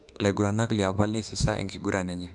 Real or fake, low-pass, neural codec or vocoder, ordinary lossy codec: fake; 10.8 kHz; autoencoder, 48 kHz, 32 numbers a frame, DAC-VAE, trained on Japanese speech; MP3, 96 kbps